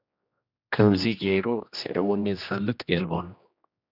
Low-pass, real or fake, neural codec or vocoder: 5.4 kHz; fake; codec, 16 kHz, 1 kbps, X-Codec, HuBERT features, trained on general audio